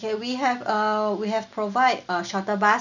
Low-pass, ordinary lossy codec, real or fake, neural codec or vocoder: 7.2 kHz; none; real; none